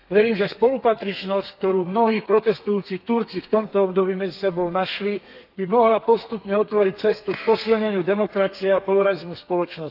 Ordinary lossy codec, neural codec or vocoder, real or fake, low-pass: none; codec, 32 kHz, 1.9 kbps, SNAC; fake; 5.4 kHz